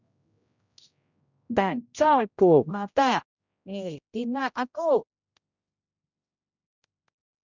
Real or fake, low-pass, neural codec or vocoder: fake; 7.2 kHz; codec, 16 kHz, 0.5 kbps, X-Codec, HuBERT features, trained on general audio